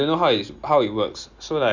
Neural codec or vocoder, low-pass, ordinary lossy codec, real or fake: none; 7.2 kHz; none; real